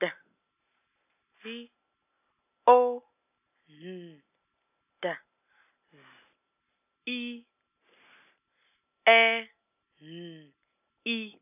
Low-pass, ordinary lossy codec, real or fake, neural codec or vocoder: 3.6 kHz; none; real; none